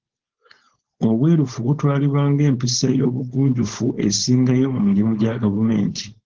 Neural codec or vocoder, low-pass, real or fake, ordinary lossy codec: codec, 16 kHz, 4.8 kbps, FACodec; 7.2 kHz; fake; Opus, 16 kbps